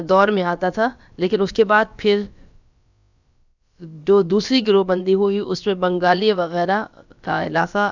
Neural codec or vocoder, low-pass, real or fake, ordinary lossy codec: codec, 16 kHz, about 1 kbps, DyCAST, with the encoder's durations; 7.2 kHz; fake; none